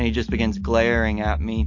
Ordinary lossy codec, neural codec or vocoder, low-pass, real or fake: MP3, 48 kbps; none; 7.2 kHz; real